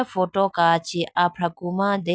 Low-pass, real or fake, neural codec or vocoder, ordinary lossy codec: none; real; none; none